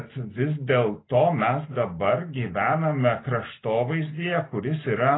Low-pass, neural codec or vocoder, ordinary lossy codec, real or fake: 7.2 kHz; none; AAC, 16 kbps; real